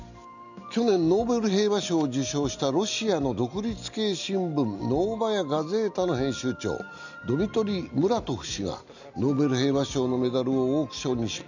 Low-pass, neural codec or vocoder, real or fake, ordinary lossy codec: 7.2 kHz; none; real; none